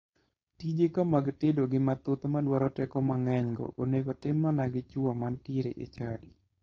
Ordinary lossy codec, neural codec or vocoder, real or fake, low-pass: AAC, 32 kbps; codec, 16 kHz, 4.8 kbps, FACodec; fake; 7.2 kHz